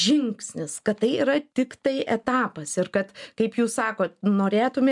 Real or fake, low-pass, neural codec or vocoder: real; 10.8 kHz; none